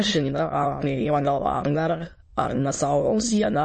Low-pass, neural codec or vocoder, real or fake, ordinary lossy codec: 9.9 kHz; autoencoder, 22.05 kHz, a latent of 192 numbers a frame, VITS, trained on many speakers; fake; MP3, 32 kbps